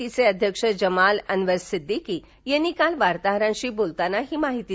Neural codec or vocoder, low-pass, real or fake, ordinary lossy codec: none; none; real; none